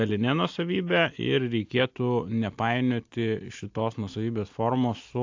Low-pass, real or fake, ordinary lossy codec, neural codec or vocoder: 7.2 kHz; real; AAC, 48 kbps; none